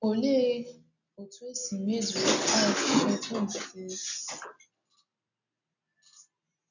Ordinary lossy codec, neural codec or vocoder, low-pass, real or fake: none; none; 7.2 kHz; real